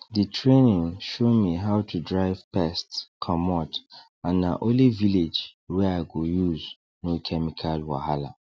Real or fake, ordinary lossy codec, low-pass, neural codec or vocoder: real; none; none; none